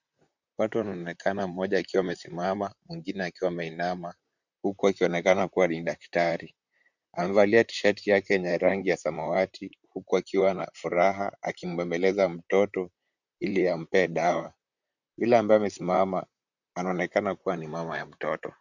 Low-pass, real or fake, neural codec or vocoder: 7.2 kHz; fake; vocoder, 44.1 kHz, 128 mel bands, Pupu-Vocoder